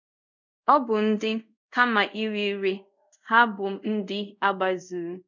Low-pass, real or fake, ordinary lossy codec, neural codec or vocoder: 7.2 kHz; fake; none; codec, 24 kHz, 0.5 kbps, DualCodec